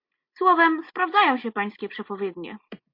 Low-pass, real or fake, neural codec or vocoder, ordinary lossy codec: 5.4 kHz; real; none; MP3, 32 kbps